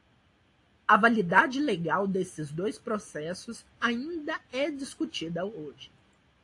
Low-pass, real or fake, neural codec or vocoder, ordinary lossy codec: 10.8 kHz; real; none; AAC, 48 kbps